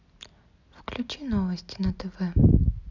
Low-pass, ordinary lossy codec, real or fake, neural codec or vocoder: 7.2 kHz; none; real; none